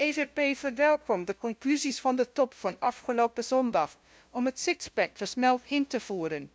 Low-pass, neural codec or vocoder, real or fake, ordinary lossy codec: none; codec, 16 kHz, 0.5 kbps, FunCodec, trained on LibriTTS, 25 frames a second; fake; none